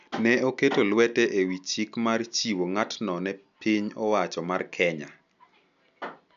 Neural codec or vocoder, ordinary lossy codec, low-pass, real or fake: none; MP3, 96 kbps; 7.2 kHz; real